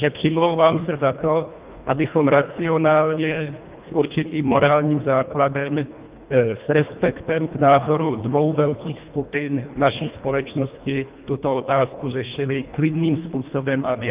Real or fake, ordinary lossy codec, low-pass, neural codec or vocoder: fake; Opus, 32 kbps; 3.6 kHz; codec, 24 kHz, 1.5 kbps, HILCodec